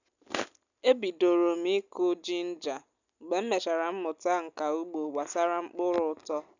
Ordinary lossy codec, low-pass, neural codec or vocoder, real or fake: none; 7.2 kHz; none; real